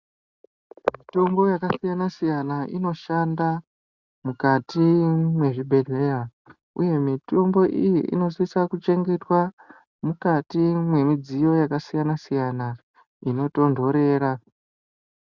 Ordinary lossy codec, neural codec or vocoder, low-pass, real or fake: Opus, 64 kbps; none; 7.2 kHz; real